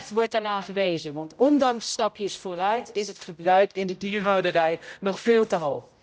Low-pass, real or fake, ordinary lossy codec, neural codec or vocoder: none; fake; none; codec, 16 kHz, 0.5 kbps, X-Codec, HuBERT features, trained on general audio